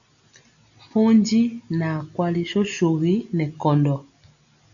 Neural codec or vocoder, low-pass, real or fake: none; 7.2 kHz; real